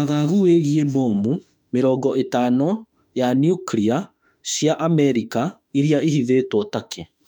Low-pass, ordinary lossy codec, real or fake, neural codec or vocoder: 19.8 kHz; none; fake; autoencoder, 48 kHz, 32 numbers a frame, DAC-VAE, trained on Japanese speech